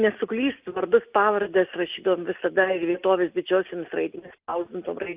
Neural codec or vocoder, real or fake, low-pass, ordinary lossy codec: none; real; 3.6 kHz; Opus, 24 kbps